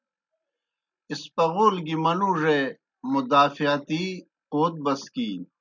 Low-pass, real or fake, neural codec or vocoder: 7.2 kHz; real; none